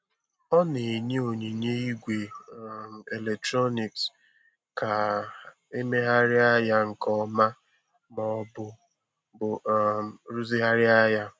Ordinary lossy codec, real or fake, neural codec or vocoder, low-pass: none; real; none; none